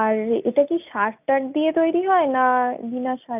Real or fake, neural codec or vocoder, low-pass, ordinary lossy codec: real; none; 3.6 kHz; none